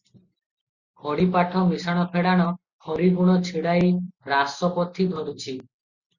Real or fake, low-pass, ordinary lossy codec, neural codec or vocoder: real; 7.2 kHz; Opus, 64 kbps; none